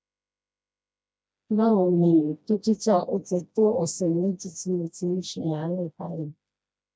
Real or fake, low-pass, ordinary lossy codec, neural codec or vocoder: fake; none; none; codec, 16 kHz, 1 kbps, FreqCodec, smaller model